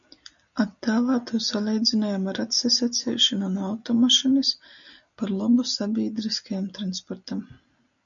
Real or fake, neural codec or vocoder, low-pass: real; none; 7.2 kHz